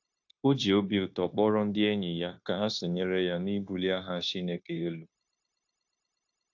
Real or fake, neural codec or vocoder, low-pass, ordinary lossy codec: fake; codec, 16 kHz, 0.9 kbps, LongCat-Audio-Codec; 7.2 kHz; AAC, 48 kbps